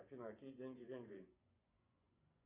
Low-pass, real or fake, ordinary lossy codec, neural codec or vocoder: 3.6 kHz; fake; Opus, 64 kbps; vocoder, 24 kHz, 100 mel bands, Vocos